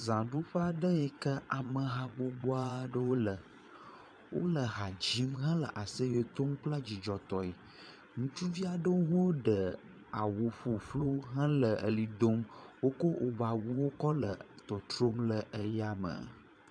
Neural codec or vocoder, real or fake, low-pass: vocoder, 22.05 kHz, 80 mel bands, Vocos; fake; 9.9 kHz